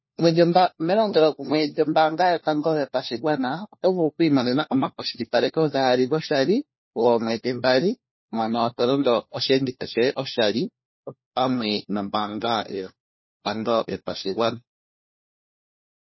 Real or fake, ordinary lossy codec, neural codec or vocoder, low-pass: fake; MP3, 24 kbps; codec, 16 kHz, 1 kbps, FunCodec, trained on LibriTTS, 50 frames a second; 7.2 kHz